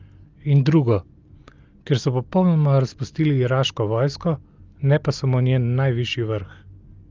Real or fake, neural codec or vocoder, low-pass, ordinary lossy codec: real; none; 7.2 kHz; Opus, 24 kbps